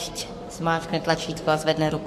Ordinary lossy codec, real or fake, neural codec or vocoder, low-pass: MP3, 64 kbps; fake; codec, 44.1 kHz, 7.8 kbps, Pupu-Codec; 14.4 kHz